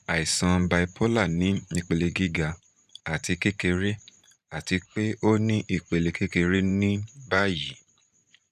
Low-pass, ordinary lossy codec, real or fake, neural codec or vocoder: 14.4 kHz; none; fake; vocoder, 44.1 kHz, 128 mel bands every 512 samples, BigVGAN v2